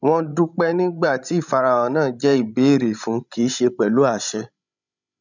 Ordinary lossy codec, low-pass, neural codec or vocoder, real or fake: none; 7.2 kHz; codec, 16 kHz, 16 kbps, FreqCodec, larger model; fake